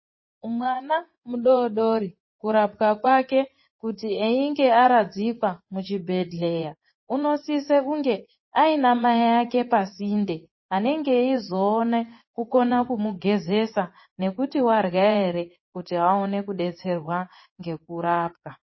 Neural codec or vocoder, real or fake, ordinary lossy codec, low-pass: vocoder, 24 kHz, 100 mel bands, Vocos; fake; MP3, 24 kbps; 7.2 kHz